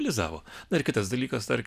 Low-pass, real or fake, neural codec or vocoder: 14.4 kHz; real; none